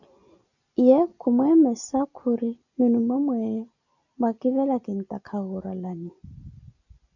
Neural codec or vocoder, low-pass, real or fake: none; 7.2 kHz; real